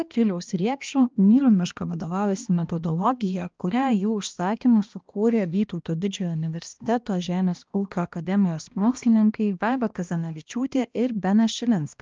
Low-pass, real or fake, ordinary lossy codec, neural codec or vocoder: 7.2 kHz; fake; Opus, 24 kbps; codec, 16 kHz, 1 kbps, X-Codec, HuBERT features, trained on balanced general audio